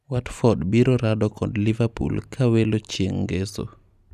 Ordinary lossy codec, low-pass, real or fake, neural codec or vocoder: none; 14.4 kHz; real; none